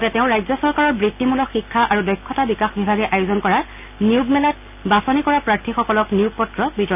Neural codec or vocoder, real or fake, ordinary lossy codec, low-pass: none; real; none; 3.6 kHz